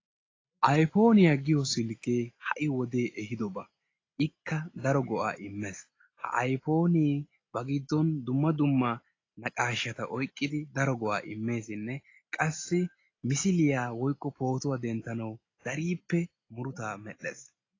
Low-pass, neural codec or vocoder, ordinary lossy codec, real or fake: 7.2 kHz; none; AAC, 32 kbps; real